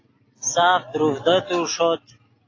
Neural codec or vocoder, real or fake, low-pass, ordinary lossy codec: none; real; 7.2 kHz; AAC, 32 kbps